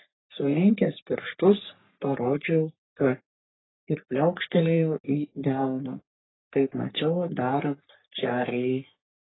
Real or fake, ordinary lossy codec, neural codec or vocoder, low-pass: fake; AAC, 16 kbps; codec, 44.1 kHz, 3.4 kbps, Pupu-Codec; 7.2 kHz